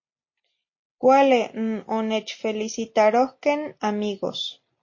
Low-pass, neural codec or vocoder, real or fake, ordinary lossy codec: 7.2 kHz; none; real; MP3, 32 kbps